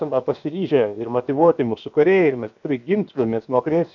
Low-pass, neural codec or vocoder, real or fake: 7.2 kHz; codec, 16 kHz, 0.7 kbps, FocalCodec; fake